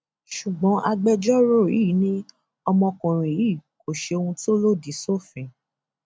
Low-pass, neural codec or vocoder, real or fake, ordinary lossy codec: none; none; real; none